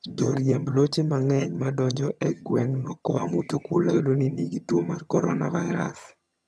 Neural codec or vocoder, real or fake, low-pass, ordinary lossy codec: vocoder, 22.05 kHz, 80 mel bands, HiFi-GAN; fake; none; none